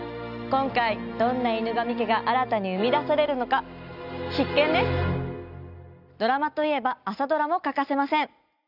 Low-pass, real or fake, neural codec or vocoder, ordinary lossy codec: 5.4 kHz; real; none; none